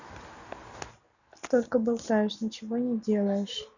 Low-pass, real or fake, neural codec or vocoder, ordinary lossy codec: 7.2 kHz; real; none; none